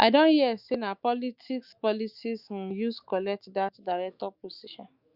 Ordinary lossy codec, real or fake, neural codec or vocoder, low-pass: Opus, 64 kbps; fake; autoencoder, 48 kHz, 128 numbers a frame, DAC-VAE, trained on Japanese speech; 5.4 kHz